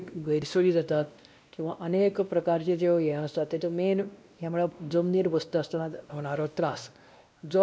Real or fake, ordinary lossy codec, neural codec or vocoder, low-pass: fake; none; codec, 16 kHz, 1 kbps, X-Codec, WavLM features, trained on Multilingual LibriSpeech; none